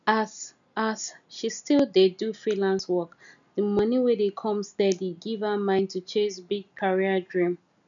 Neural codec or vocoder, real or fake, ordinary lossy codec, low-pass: none; real; none; 7.2 kHz